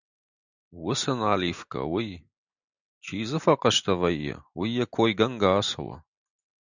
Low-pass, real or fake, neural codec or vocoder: 7.2 kHz; real; none